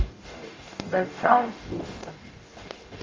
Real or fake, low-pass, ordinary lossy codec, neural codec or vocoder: fake; 7.2 kHz; Opus, 32 kbps; codec, 44.1 kHz, 0.9 kbps, DAC